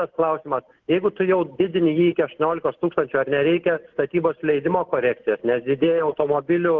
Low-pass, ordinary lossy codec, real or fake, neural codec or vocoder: 7.2 kHz; Opus, 16 kbps; real; none